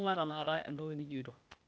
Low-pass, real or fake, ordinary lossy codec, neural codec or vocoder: none; fake; none; codec, 16 kHz, 0.8 kbps, ZipCodec